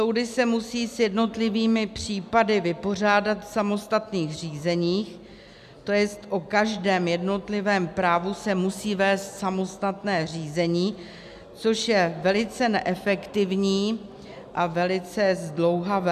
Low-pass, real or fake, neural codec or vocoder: 14.4 kHz; real; none